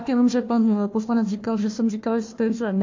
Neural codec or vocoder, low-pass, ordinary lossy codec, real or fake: codec, 16 kHz, 1 kbps, FunCodec, trained on Chinese and English, 50 frames a second; 7.2 kHz; MP3, 48 kbps; fake